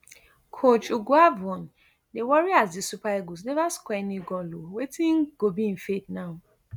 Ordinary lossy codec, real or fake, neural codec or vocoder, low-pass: none; real; none; none